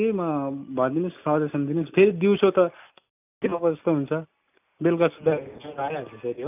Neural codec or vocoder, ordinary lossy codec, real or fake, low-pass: none; none; real; 3.6 kHz